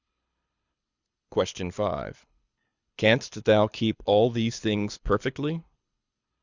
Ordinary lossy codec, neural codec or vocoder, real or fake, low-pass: Opus, 64 kbps; codec, 24 kHz, 6 kbps, HILCodec; fake; 7.2 kHz